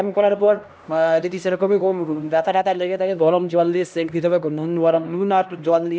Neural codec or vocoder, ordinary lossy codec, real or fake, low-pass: codec, 16 kHz, 1 kbps, X-Codec, HuBERT features, trained on LibriSpeech; none; fake; none